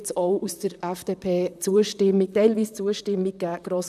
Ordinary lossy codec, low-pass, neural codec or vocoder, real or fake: none; 14.4 kHz; vocoder, 44.1 kHz, 128 mel bands, Pupu-Vocoder; fake